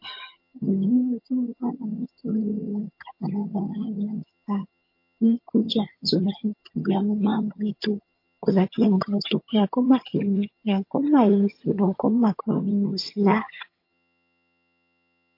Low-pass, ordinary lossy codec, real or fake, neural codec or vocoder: 5.4 kHz; MP3, 32 kbps; fake; vocoder, 22.05 kHz, 80 mel bands, HiFi-GAN